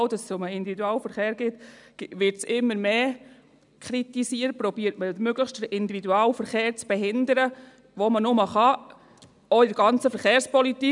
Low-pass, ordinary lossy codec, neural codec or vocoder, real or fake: 10.8 kHz; none; none; real